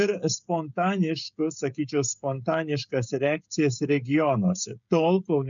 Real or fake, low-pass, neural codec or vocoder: fake; 7.2 kHz; codec, 16 kHz, 16 kbps, FreqCodec, smaller model